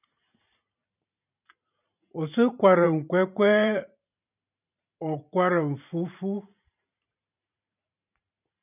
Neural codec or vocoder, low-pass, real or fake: vocoder, 44.1 kHz, 128 mel bands every 512 samples, BigVGAN v2; 3.6 kHz; fake